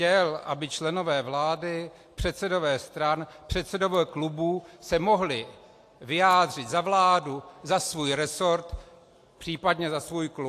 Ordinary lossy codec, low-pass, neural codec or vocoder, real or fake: AAC, 64 kbps; 14.4 kHz; none; real